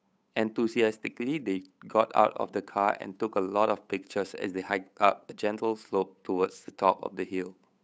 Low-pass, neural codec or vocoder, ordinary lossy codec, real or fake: none; codec, 16 kHz, 8 kbps, FunCodec, trained on Chinese and English, 25 frames a second; none; fake